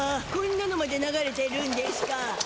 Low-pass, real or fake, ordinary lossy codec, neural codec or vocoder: none; real; none; none